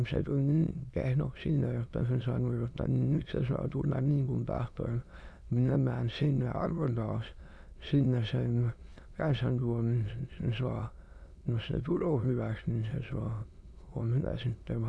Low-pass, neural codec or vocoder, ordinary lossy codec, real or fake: none; autoencoder, 22.05 kHz, a latent of 192 numbers a frame, VITS, trained on many speakers; none; fake